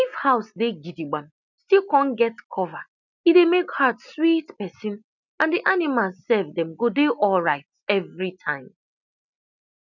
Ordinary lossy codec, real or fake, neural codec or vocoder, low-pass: none; real; none; 7.2 kHz